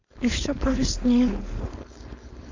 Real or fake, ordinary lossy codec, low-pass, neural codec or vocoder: fake; AAC, 48 kbps; 7.2 kHz; codec, 16 kHz, 4.8 kbps, FACodec